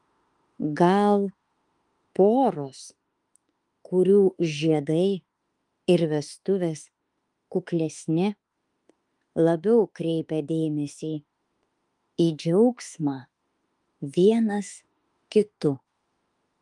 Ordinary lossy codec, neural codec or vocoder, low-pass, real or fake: Opus, 32 kbps; autoencoder, 48 kHz, 32 numbers a frame, DAC-VAE, trained on Japanese speech; 10.8 kHz; fake